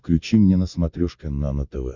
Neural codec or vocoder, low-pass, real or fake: none; 7.2 kHz; real